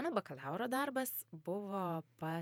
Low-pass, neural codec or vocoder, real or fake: 19.8 kHz; vocoder, 48 kHz, 128 mel bands, Vocos; fake